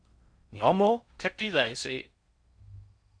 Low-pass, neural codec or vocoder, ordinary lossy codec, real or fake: 9.9 kHz; codec, 16 kHz in and 24 kHz out, 0.6 kbps, FocalCodec, streaming, 4096 codes; Opus, 64 kbps; fake